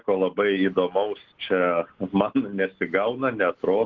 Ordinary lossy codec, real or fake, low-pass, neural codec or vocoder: Opus, 16 kbps; real; 7.2 kHz; none